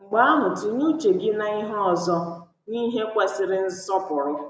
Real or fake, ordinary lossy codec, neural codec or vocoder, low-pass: real; none; none; none